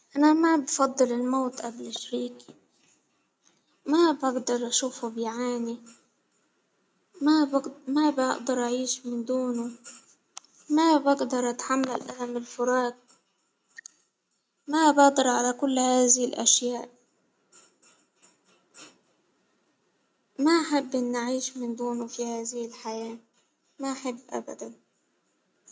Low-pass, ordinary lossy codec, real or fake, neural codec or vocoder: none; none; real; none